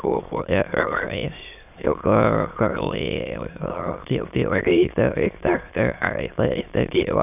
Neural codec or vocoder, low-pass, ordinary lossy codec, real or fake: autoencoder, 22.05 kHz, a latent of 192 numbers a frame, VITS, trained on many speakers; 3.6 kHz; none; fake